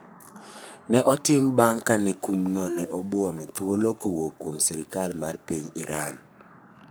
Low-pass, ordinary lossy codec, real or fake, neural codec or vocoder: none; none; fake; codec, 44.1 kHz, 3.4 kbps, Pupu-Codec